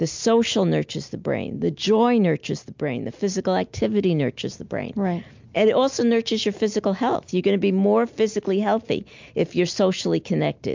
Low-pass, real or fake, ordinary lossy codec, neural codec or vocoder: 7.2 kHz; real; MP3, 64 kbps; none